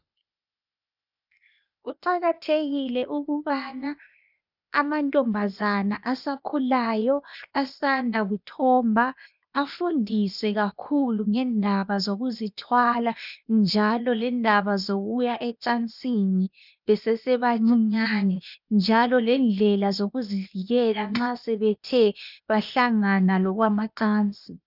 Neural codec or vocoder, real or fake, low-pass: codec, 16 kHz, 0.8 kbps, ZipCodec; fake; 5.4 kHz